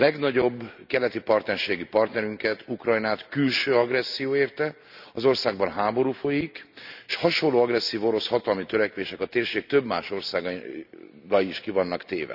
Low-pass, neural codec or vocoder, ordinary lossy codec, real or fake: 5.4 kHz; none; none; real